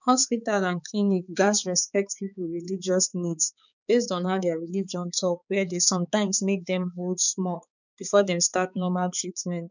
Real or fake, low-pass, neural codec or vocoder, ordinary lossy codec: fake; 7.2 kHz; codec, 16 kHz, 4 kbps, X-Codec, HuBERT features, trained on balanced general audio; none